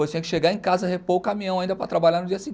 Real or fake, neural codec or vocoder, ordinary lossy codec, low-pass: real; none; none; none